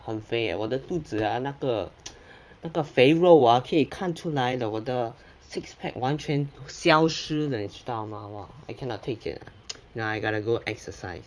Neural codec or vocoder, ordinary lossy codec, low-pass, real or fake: vocoder, 22.05 kHz, 80 mel bands, Vocos; none; none; fake